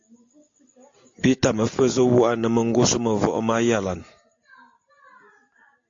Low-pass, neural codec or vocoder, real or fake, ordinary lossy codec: 7.2 kHz; none; real; AAC, 48 kbps